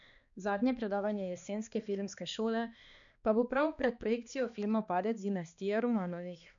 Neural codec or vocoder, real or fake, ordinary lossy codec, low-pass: codec, 16 kHz, 2 kbps, X-Codec, HuBERT features, trained on balanced general audio; fake; none; 7.2 kHz